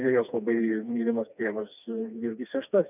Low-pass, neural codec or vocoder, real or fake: 3.6 kHz; codec, 16 kHz, 2 kbps, FreqCodec, smaller model; fake